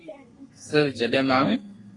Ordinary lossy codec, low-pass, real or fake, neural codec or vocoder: AAC, 32 kbps; 10.8 kHz; fake; codec, 32 kHz, 1.9 kbps, SNAC